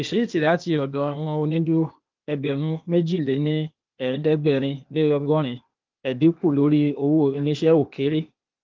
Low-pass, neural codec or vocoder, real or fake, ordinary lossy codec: 7.2 kHz; codec, 16 kHz, 0.8 kbps, ZipCodec; fake; Opus, 24 kbps